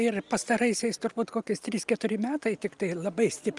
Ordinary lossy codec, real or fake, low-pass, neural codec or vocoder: Opus, 32 kbps; real; 10.8 kHz; none